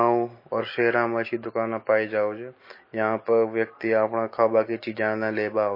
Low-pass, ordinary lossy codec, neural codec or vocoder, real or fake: 5.4 kHz; MP3, 24 kbps; none; real